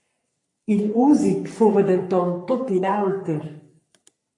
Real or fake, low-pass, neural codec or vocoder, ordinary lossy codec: fake; 10.8 kHz; codec, 44.1 kHz, 3.4 kbps, Pupu-Codec; MP3, 48 kbps